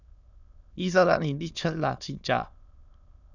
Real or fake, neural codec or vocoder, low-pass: fake; autoencoder, 22.05 kHz, a latent of 192 numbers a frame, VITS, trained on many speakers; 7.2 kHz